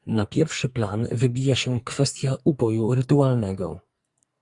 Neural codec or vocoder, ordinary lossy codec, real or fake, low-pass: codec, 44.1 kHz, 2.6 kbps, SNAC; Opus, 64 kbps; fake; 10.8 kHz